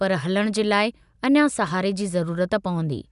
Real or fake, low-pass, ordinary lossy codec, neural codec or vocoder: real; 10.8 kHz; none; none